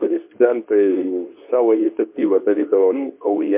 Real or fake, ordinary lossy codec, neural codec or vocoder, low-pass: fake; MP3, 32 kbps; codec, 24 kHz, 0.9 kbps, WavTokenizer, medium speech release version 2; 3.6 kHz